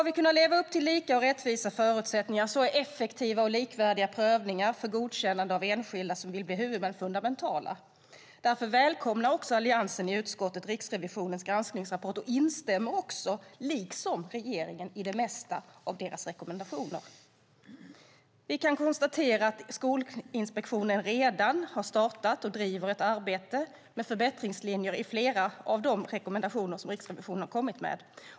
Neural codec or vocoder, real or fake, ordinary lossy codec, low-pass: none; real; none; none